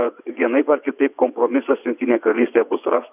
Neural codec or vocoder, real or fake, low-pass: vocoder, 22.05 kHz, 80 mel bands, WaveNeXt; fake; 3.6 kHz